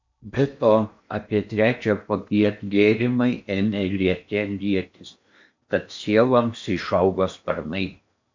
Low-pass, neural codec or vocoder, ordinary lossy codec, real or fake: 7.2 kHz; codec, 16 kHz in and 24 kHz out, 0.8 kbps, FocalCodec, streaming, 65536 codes; MP3, 64 kbps; fake